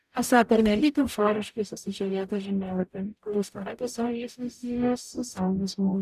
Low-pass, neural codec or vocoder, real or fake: 14.4 kHz; codec, 44.1 kHz, 0.9 kbps, DAC; fake